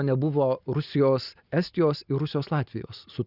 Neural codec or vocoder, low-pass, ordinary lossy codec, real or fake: none; 5.4 kHz; Opus, 64 kbps; real